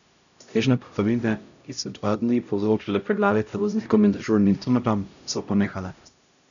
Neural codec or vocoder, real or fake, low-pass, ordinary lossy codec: codec, 16 kHz, 0.5 kbps, X-Codec, HuBERT features, trained on LibriSpeech; fake; 7.2 kHz; none